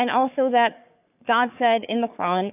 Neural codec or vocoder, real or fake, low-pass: codec, 44.1 kHz, 3.4 kbps, Pupu-Codec; fake; 3.6 kHz